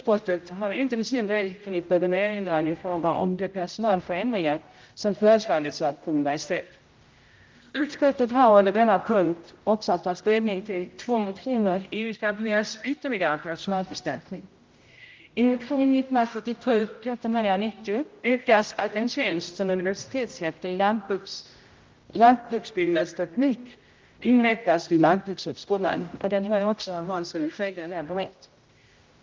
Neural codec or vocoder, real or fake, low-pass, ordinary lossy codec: codec, 16 kHz, 0.5 kbps, X-Codec, HuBERT features, trained on general audio; fake; 7.2 kHz; Opus, 24 kbps